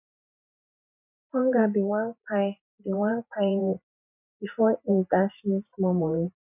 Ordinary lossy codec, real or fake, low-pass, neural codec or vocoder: none; fake; 3.6 kHz; vocoder, 44.1 kHz, 128 mel bands every 512 samples, BigVGAN v2